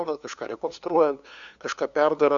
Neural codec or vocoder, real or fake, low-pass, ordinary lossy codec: codec, 16 kHz, 2 kbps, FunCodec, trained on LibriTTS, 25 frames a second; fake; 7.2 kHz; Opus, 64 kbps